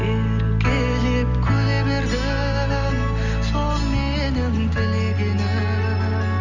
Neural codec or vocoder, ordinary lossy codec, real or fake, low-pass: none; Opus, 32 kbps; real; 7.2 kHz